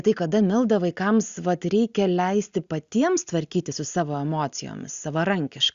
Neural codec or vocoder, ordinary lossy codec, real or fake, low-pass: none; Opus, 64 kbps; real; 7.2 kHz